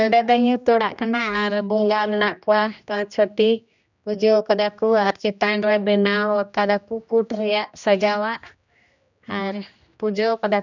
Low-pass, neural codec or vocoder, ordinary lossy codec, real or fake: 7.2 kHz; codec, 16 kHz, 1 kbps, X-Codec, HuBERT features, trained on general audio; none; fake